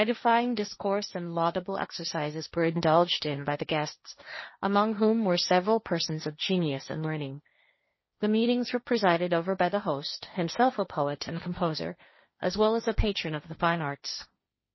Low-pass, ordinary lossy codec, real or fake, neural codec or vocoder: 7.2 kHz; MP3, 24 kbps; fake; codec, 16 kHz, 1.1 kbps, Voila-Tokenizer